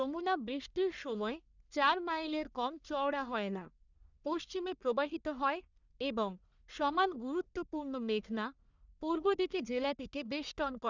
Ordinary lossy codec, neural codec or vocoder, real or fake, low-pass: none; codec, 44.1 kHz, 1.7 kbps, Pupu-Codec; fake; 7.2 kHz